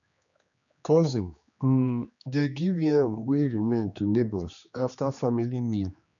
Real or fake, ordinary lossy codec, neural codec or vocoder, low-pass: fake; none; codec, 16 kHz, 2 kbps, X-Codec, HuBERT features, trained on general audio; 7.2 kHz